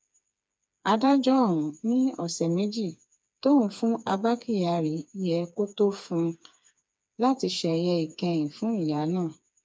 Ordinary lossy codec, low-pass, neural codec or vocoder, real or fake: none; none; codec, 16 kHz, 4 kbps, FreqCodec, smaller model; fake